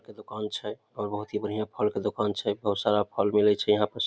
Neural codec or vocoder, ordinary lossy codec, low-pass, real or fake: none; none; none; real